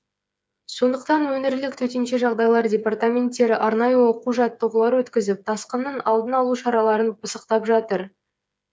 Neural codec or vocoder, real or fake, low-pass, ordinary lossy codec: codec, 16 kHz, 8 kbps, FreqCodec, smaller model; fake; none; none